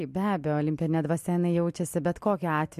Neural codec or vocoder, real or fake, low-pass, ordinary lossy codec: none; real; 14.4 kHz; MP3, 64 kbps